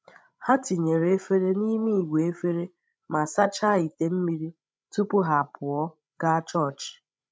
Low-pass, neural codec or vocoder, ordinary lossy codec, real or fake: none; codec, 16 kHz, 16 kbps, FreqCodec, larger model; none; fake